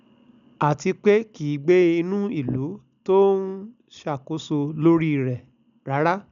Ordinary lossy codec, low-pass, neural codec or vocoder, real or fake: none; 7.2 kHz; none; real